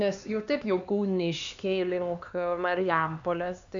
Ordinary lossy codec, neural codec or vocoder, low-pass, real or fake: AAC, 64 kbps; codec, 16 kHz, 2 kbps, X-Codec, HuBERT features, trained on LibriSpeech; 7.2 kHz; fake